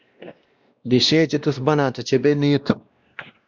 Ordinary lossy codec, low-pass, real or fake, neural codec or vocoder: Opus, 64 kbps; 7.2 kHz; fake; codec, 16 kHz, 1 kbps, X-Codec, WavLM features, trained on Multilingual LibriSpeech